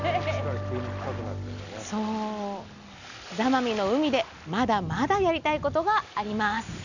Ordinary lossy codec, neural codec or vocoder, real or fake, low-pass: none; none; real; 7.2 kHz